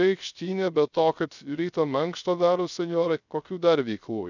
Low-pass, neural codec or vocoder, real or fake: 7.2 kHz; codec, 16 kHz, 0.3 kbps, FocalCodec; fake